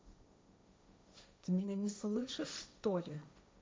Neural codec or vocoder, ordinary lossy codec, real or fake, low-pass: codec, 16 kHz, 1.1 kbps, Voila-Tokenizer; none; fake; none